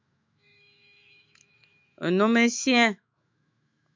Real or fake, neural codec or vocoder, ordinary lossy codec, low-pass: fake; autoencoder, 48 kHz, 128 numbers a frame, DAC-VAE, trained on Japanese speech; MP3, 64 kbps; 7.2 kHz